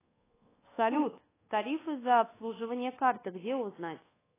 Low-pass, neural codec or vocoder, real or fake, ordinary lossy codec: 3.6 kHz; codec, 24 kHz, 1.2 kbps, DualCodec; fake; AAC, 16 kbps